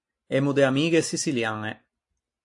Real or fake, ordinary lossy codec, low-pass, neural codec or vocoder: real; AAC, 64 kbps; 10.8 kHz; none